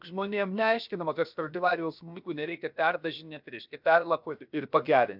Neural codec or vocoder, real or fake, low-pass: codec, 16 kHz, 0.7 kbps, FocalCodec; fake; 5.4 kHz